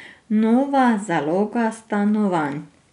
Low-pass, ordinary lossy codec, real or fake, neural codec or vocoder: 10.8 kHz; none; real; none